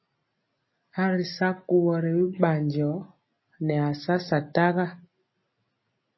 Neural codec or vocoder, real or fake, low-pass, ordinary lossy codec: none; real; 7.2 kHz; MP3, 24 kbps